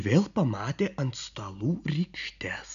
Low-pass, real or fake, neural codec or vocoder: 7.2 kHz; real; none